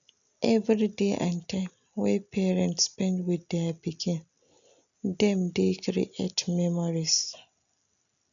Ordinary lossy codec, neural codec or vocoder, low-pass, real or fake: none; none; 7.2 kHz; real